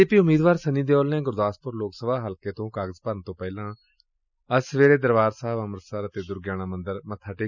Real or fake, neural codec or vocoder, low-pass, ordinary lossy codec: real; none; 7.2 kHz; none